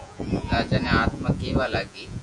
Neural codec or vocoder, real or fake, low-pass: vocoder, 48 kHz, 128 mel bands, Vocos; fake; 10.8 kHz